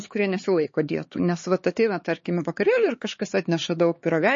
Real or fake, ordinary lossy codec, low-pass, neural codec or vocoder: fake; MP3, 32 kbps; 7.2 kHz; codec, 16 kHz, 4 kbps, X-Codec, HuBERT features, trained on LibriSpeech